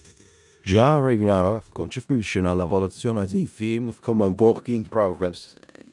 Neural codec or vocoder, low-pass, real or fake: codec, 16 kHz in and 24 kHz out, 0.4 kbps, LongCat-Audio-Codec, four codebook decoder; 10.8 kHz; fake